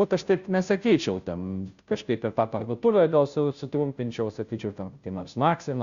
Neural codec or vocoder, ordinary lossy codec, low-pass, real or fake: codec, 16 kHz, 0.5 kbps, FunCodec, trained on Chinese and English, 25 frames a second; Opus, 64 kbps; 7.2 kHz; fake